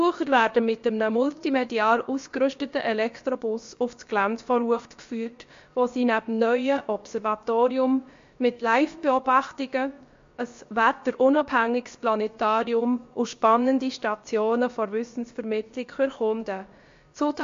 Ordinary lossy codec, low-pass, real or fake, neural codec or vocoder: MP3, 48 kbps; 7.2 kHz; fake; codec, 16 kHz, about 1 kbps, DyCAST, with the encoder's durations